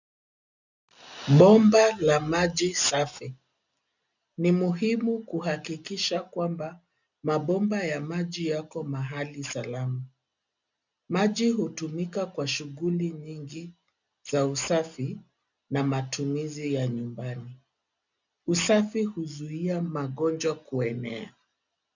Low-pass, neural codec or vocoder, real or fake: 7.2 kHz; none; real